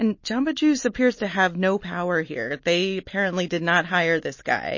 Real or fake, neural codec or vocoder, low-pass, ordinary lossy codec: real; none; 7.2 kHz; MP3, 32 kbps